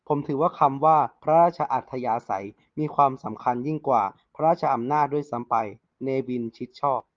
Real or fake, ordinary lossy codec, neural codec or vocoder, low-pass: real; Opus, 32 kbps; none; 7.2 kHz